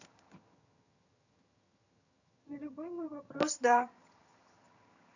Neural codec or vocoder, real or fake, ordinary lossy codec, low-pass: vocoder, 22.05 kHz, 80 mel bands, HiFi-GAN; fake; none; 7.2 kHz